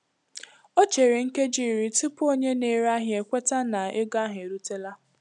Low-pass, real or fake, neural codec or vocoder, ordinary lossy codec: 10.8 kHz; real; none; none